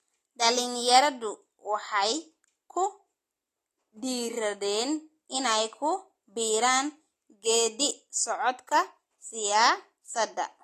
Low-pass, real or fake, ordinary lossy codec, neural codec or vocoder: 14.4 kHz; real; AAC, 48 kbps; none